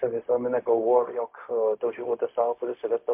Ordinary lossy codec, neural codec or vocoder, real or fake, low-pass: MP3, 32 kbps; codec, 16 kHz, 0.4 kbps, LongCat-Audio-Codec; fake; 3.6 kHz